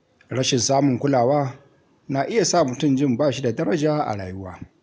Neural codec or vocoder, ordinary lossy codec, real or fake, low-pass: none; none; real; none